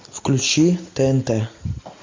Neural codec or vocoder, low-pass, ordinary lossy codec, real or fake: none; 7.2 kHz; MP3, 64 kbps; real